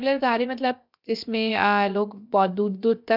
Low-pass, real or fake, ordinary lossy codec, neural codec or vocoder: 5.4 kHz; fake; none; codec, 16 kHz, about 1 kbps, DyCAST, with the encoder's durations